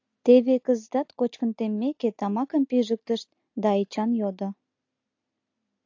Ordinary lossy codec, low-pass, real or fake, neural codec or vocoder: AAC, 48 kbps; 7.2 kHz; real; none